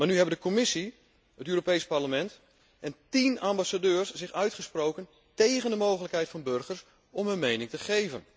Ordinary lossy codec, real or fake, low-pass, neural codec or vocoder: none; real; none; none